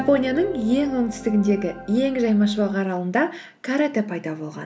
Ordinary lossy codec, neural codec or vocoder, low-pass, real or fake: none; none; none; real